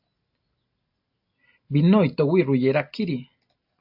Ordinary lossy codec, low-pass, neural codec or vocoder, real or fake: AAC, 48 kbps; 5.4 kHz; none; real